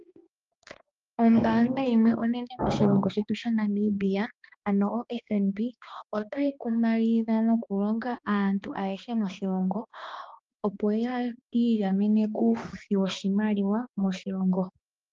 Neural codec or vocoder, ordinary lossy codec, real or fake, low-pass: codec, 16 kHz, 2 kbps, X-Codec, HuBERT features, trained on balanced general audio; Opus, 32 kbps; fake; 7.2 kHz